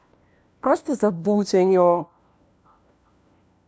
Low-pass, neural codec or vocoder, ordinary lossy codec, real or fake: none; codec, 16 kHz, 1 kbps, FunCodec, trained on LibriTTS, 50 frames a second; none; fake